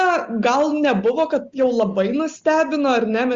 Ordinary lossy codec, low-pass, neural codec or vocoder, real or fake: Opus, 32 kbps; 7.2 kHz; none; real